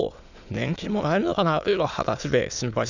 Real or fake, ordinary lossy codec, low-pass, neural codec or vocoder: fake; none; 7.2 kHz; autoencoder, 22.05 kHz, a latent of 192 numbers a frame, VITS, trained on many speakers